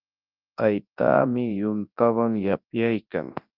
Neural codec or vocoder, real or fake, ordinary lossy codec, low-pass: codec, 24 kHz, 0.9 kbps, WavTokenizer, large speech release; fake; Opus, 24 kbps; 5.4 kHz